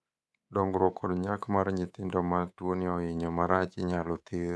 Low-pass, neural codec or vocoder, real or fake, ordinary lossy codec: none; codec, 24 kHz, 3.1 kbps, DualCodec; fake; none